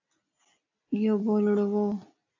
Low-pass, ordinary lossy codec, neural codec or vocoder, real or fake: 7.2 kHz; AAC, 48 kbps; none; real